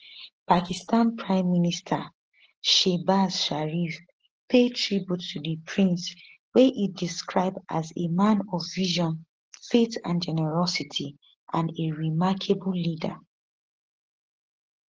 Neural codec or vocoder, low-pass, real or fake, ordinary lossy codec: none; 7.2 kHz; real; Opus, 16 kbps